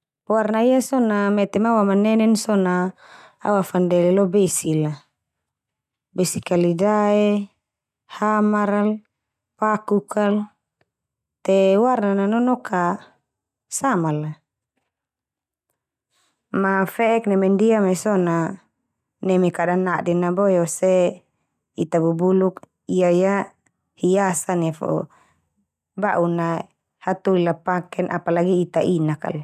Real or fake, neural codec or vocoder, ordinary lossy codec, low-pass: real; none; none; 14.4 kHz